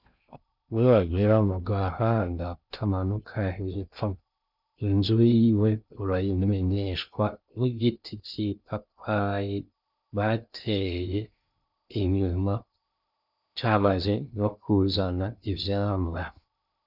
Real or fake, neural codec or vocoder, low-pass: fake; codec, 16 kHz in and 24 kHz out, 0.6 kbps, FocalCodec, streaming, 2048 codes; 5.4 kHz